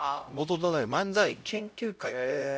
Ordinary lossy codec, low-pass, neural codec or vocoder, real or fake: none; none; codec, 16 kHz, 0.5 kbps, X-Codec, HuBERT features, trained on LibriSpeech; fake